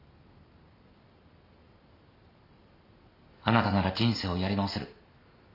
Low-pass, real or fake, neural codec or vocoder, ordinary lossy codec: 5.4 kHz; real; none; none